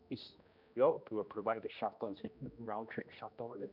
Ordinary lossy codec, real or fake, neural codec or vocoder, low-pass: none; fake; codec, 16 kHz, 1 kbps, X-Codec, HuBERT features, trained on general audio; 5.4 kHz